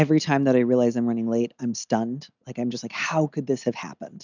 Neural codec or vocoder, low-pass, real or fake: none; 7.2 kHz; real